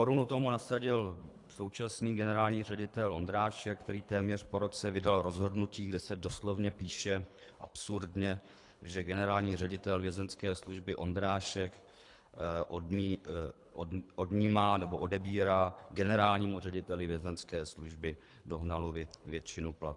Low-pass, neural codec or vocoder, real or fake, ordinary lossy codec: 10.8 kHz; codec, 24 kHz, 3 kbps, HILCodec; fake; AAC, 64 kbps